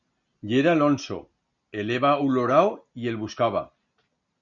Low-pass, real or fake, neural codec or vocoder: 7.2 kHz; real; none